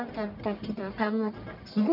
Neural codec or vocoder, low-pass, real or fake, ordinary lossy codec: codec, 44.1 kHz, 1.7 kbps, Pupu-Codec; 5.4 kHz; fake; none